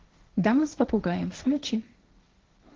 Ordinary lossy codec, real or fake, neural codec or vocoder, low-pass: Opus, 16 kbps; fake; codec, 16 kHz, 1.1 kbps, Voila-Tokenizer; 7.2 kHz